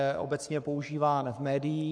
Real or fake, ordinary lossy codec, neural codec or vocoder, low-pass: fake; AAC, 64 kbps; codec, 44.1 kHz, 7.8 kbps, Pupu-Codec; 9.9 kHz